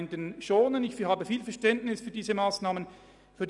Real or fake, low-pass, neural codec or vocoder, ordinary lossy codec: real; 10.8 kHz; none; none